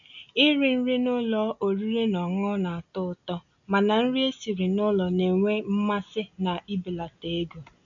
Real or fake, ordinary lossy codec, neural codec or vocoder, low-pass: real; none; none; 7.2 kHz